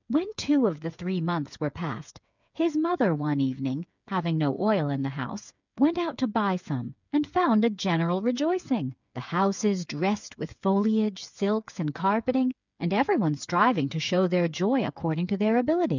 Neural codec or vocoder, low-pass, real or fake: codec, 16 kHz, 8 kbps, FreqCodec, smaller model; 7.2 kHz; fake